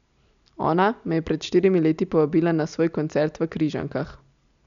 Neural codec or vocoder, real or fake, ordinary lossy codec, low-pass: none; real; none; 7.2 kHz